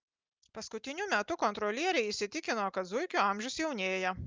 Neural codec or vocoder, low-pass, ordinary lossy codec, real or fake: none; 7.2 kHz; Opus, 32 kbps; real